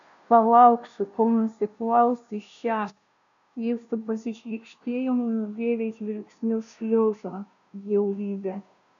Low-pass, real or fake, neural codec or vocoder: 7.2 kHz; fake; codec, 16 kHz, 0.5 kbps, FunCodec, trained on Chinese and English, 25 frames a second